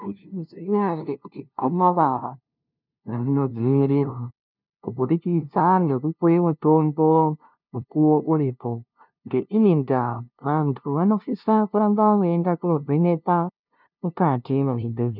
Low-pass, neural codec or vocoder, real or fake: 5.4 kHz; codec, 16 kHz, 0.5 kbps, FunCodec, trained on LibriTTS, 25 frames a second; fake